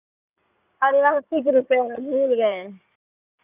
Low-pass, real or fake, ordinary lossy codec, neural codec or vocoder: 3.6 kHz; fake; none; codec, 16 kHz in and 24 kHz out, 2.2 kbps, FireRedTTS-2 codec